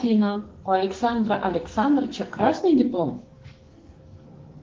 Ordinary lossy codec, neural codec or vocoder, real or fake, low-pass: Opus, 16 kbps; codec, 44.1 kHz, 2.6 kbps, SNAC; fake; 7.2 kHz